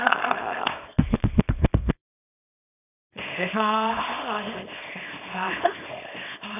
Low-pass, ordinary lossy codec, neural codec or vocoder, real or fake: 3.6 kHz; none; codec, 24 kHz, 0.9 kbps, WavTokenizer, small release; fake